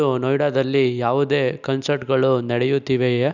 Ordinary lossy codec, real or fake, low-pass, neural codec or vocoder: none; real; 7.2 kHz; none